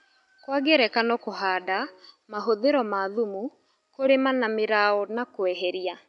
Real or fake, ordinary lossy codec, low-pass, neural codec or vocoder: real; none; 10.8 kHz; none